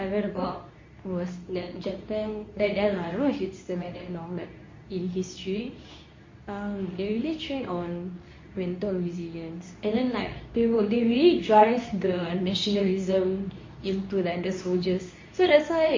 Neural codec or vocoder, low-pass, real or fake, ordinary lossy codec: codec, 24 kHz, 0.9 kbps, WavTokenizer, medium speech release version 2; 7.2 kHz; fake; MP3, 32 kbps